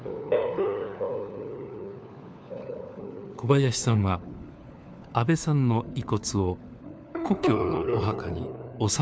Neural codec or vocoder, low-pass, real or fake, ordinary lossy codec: codec, 16 kHz, 4 kbps, FunCodec, trained on LibriTTS, 50 frames a second; none; fake; none